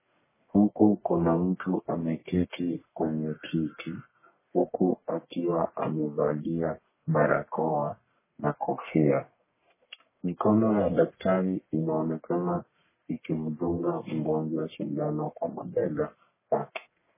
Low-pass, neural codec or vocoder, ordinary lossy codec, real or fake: 3.6 kHz; codec, 44.1 kHz, 1.7 kbps, Pupu-Codec; MP3, 16 kbps; fake